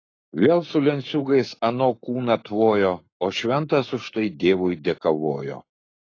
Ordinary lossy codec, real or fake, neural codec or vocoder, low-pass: AAC, 32 kbps; fake; codec, 44.1 kHz, 7.8 kbps, Pupu-Codec; 7.2 kHz